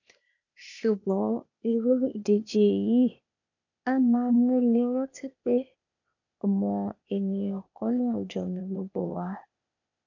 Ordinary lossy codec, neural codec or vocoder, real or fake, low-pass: none; codec, 16 kHz, 0.8 kbps, ZipCodec; fake; 7.2 kHz